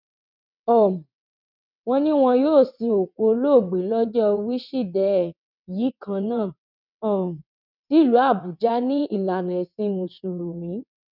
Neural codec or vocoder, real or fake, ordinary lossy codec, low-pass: vocoder, 22.05 kHz, 80 mel bands, WaveNeXt; fake; none; 5.4 kHz